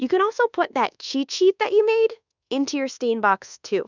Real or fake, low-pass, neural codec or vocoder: fake; 7.2 kHz; codec, 24 kHz, 1.2 kbps, DualCodec